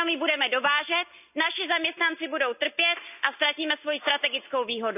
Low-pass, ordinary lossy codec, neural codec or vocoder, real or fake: 3.6 kHz; none; none; real